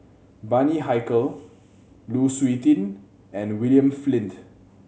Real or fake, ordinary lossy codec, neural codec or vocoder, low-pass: real; none; none; none